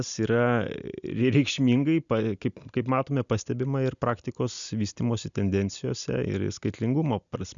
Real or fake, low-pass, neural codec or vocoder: real; 7.2 kHz; none